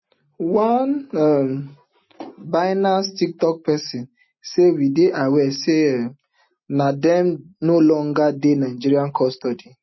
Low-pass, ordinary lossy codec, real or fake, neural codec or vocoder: 7.2 kHz; MP3, 24 kbps; real; none